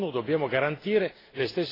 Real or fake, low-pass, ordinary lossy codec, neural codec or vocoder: real; 5.4 kHz; AAC, 24 kbps; none